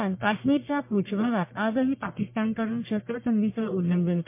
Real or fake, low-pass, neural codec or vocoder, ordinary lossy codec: fake; 3.6 kHz; codec, 44.1 kHz, 1.7 kbps, Pupu-Codec; MP3, 24 kbps